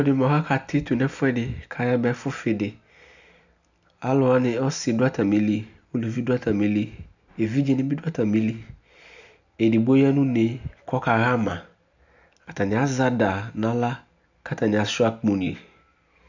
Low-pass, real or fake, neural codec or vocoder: 7.2 kHz; real; none